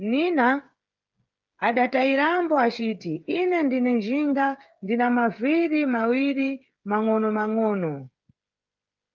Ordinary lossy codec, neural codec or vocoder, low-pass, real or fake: Opus, 32 kbps; codec, 16 kHz, 8 kbps, FreqCodec, smaller model; 7.2 kHz; fake